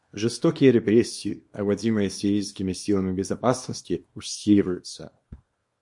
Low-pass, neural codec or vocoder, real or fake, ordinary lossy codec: 10.8 kHz; codec, 24 kHz, 0.9 kbps, WavTokenizer, small release; fake; MP3, 48 kbps